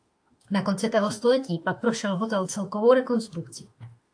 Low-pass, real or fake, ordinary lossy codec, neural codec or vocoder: 9.9 kHz; fake; AAC, 64 kbps; autoencoder, 48 kHz, 32 numbers a frame, DAC-VAE, trained on Japanese speech